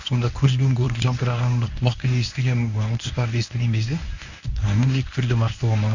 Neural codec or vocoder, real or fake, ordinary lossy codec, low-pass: codec, 24 kHz, 0.9 kbps, WavTokenizer, medium speech release version 1; fake; none; 7.2 kHz